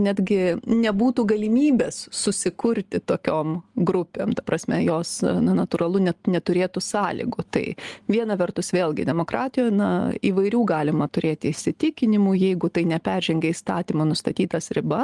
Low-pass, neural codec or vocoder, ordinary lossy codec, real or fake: 10.8 kHz; none; Opus, 24 kbps; real